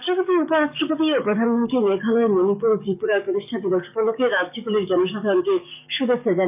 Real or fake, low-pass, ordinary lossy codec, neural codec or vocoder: fake; 3.6 kHz; none; codec, 44.1 kHz, 7.8 kbps, DAC